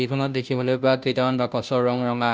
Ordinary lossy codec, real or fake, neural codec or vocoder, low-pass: none; fake; codec, 16 kHz, 0.5 kbps, FunCodec, trained on Chinese and English, 25 frames a second; none